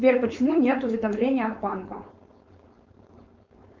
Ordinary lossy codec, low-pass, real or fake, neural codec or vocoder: Opus, 24 kbps; 7.2 kHz; fake; codec, 16 kHz, 4.8 kbps, FACodec